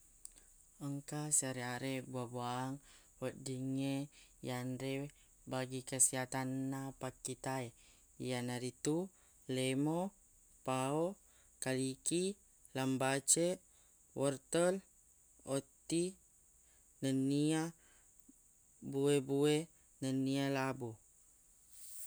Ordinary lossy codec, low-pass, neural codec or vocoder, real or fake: none; none; none; real